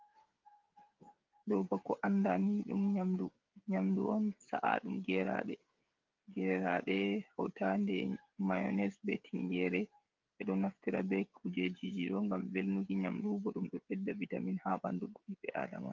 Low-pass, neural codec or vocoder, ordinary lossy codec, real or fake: 7.2 kHz; codec, 16 kHz, 16 kbps, FreqCodec, smaller model; Opus, 32 kbps; fake